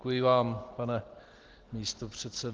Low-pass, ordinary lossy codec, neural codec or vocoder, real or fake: 7.2 kHz; Opus, 16 kbps; none; real